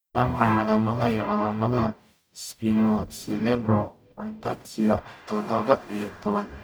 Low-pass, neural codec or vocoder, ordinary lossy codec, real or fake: none; codec, 44.1 kHz, 0.9 kbps, DAC; none; fake